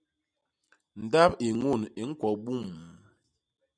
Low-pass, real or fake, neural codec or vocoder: 9.9 kHz; real; none